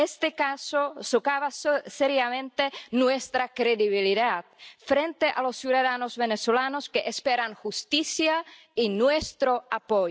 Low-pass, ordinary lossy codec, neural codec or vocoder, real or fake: none; none; none; real